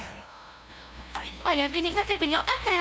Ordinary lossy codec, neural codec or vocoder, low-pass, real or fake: none; codec, 16 kHz, 0.5 kbps, FunCodec, trained on LibriTTS, 25 frames a second; none; fake